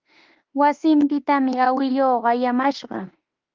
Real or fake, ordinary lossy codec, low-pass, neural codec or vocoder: fake; Opus, 32 kbps; 7.2 kHz; autoencoder, 48 kHz, 32 numbers a frame, DAC-VAE, trained on Japanese speech